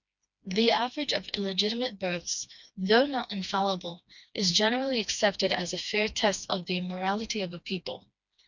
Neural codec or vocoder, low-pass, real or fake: codec, 16 kHz, 2 kbps, FreqCodec, smaller model; 7.2 kHz; fake